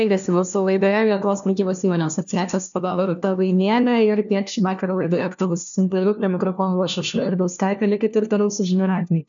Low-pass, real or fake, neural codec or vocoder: 7.2 kHz; fake; codec, 16 kHz, 1 kbps, FunCodec, trained on LibriTTS, 50 frames a second